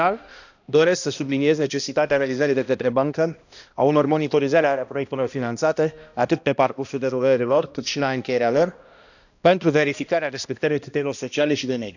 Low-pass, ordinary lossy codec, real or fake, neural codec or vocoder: 7.2 kHz; none; fake; codec, 16 kHz, 1 kbps, X-Codec, HuBERT features, trained on balanced general audio